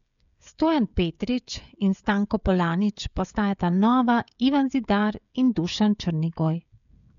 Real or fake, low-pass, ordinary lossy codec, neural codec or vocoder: fake; 7.2 kHz; none; codec, 16 kHz, 16 kbps, FreqCodec, smaller model